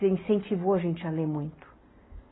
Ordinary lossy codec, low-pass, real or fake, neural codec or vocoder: AAC, 16 kbps; 7.2 kHz; real; none